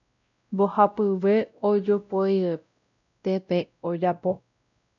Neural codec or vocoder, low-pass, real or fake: codec, 16 kHz, 0.5 kbps, X-Codec, WavLM features, trained on Multilingual LibriSpeech; 7.2 kHz; fake